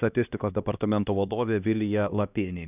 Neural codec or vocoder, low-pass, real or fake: codec, 16 kHz, 1 kbps, X-Codec, HuBERT features, trained on LibriSpeech; 3.6 kHz; fake